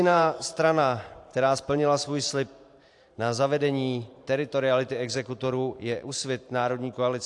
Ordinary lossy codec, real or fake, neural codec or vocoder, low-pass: AAC, 64 kbps; fake; vocoder, 44.1 kHz, 128 mel bands every 512 samples, BigVGAN v2; 10.8 kHz